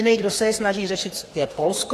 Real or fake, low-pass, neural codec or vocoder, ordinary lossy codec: fake; 14.4 kHz; codec, 32 kHz, 1.9 kbps, SNAC; AAC, 48 kbps